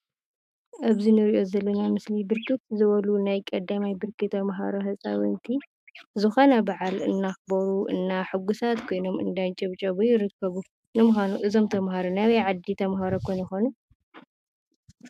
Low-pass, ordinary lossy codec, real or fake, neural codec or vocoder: 14.4 kHz; AAC, 96 kbps; fake; autoencoder, 48 kHz, 128 numbers a frame, DAC-VAE, trained on Japanese speech